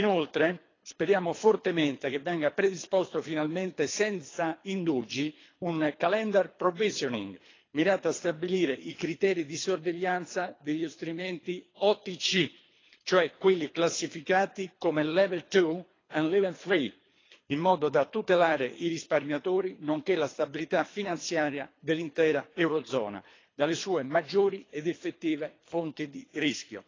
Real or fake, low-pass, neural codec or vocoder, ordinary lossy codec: fake; 7.2 kHz; codec, 24 kHz, 3 kbps, HILCodec; AAC, 32 kbps